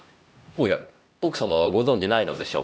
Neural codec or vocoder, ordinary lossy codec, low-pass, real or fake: codec, 16 kHz, 1 kbps, X-Codec, HuBERT features, trained on LibriSpeech; none; none; fake